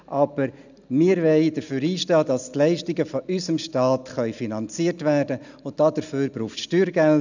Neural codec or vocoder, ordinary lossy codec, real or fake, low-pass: none; none; real; 7.2 kHz